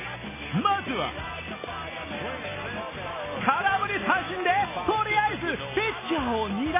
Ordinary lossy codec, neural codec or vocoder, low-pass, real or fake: MP3, 16 kbps; none; 3.6 kHz; real